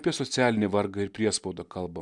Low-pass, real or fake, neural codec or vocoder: 10.8 kHz; real; none